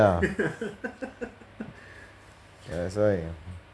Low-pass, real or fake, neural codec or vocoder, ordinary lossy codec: none; real; none; none